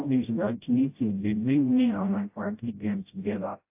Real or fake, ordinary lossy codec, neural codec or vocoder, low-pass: fake; AAC, 32 kbps; codec, 16 kHz, 0.5 kbps, FreqCodec, smaller model; 3.6 kHz